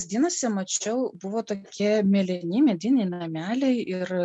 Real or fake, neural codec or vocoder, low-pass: real; none; 10.8 kHz